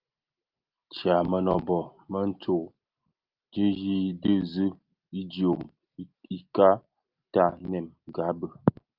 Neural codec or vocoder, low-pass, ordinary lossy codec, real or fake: none; 5.4 kHz; Opus, 24 kbps; real